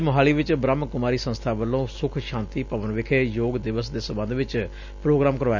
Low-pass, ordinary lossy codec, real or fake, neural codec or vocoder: 7.2 kHz; none; real; none